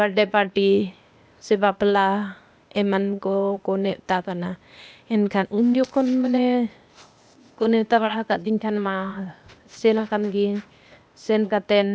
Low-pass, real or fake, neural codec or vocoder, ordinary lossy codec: none; fake; codec, 16 kHz, 0.8 kbps, ZipCodec; none